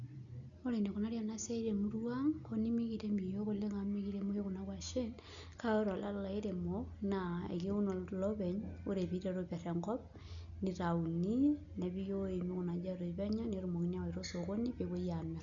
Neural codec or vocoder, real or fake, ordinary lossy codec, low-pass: none; real; none; 7.2 kHz